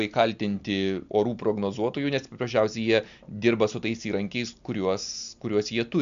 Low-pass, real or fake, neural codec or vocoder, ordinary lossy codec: 7.2 kHz; real; none; MP3, 64 kbps